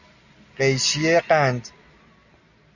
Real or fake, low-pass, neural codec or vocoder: real; 7.2 kHz; none